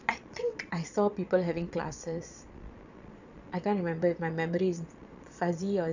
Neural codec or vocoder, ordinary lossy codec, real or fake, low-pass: vocoder, 22.05 kHz, 80 mel bands, Vocos; none; fake; 7.2 kHz